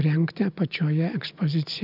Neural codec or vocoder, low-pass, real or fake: none; 5.4 kHz; real